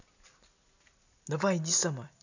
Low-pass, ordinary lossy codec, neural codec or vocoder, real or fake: 7.2 kHz; none; none; real